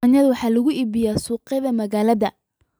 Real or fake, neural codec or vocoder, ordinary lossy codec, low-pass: fake; vocoder, 44.1 kHz, 128 mel bands every 256 samples, BigVGAN v2; none; none